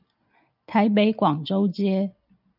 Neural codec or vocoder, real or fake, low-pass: none; real; 5.4 kHz